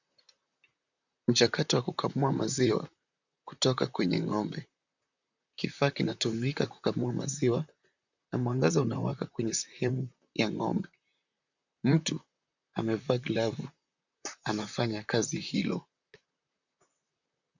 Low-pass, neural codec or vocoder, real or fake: 7.2 kHz; vocoder, 44.1 kHz, 128 mel bands, Pupu-Vocoder; fake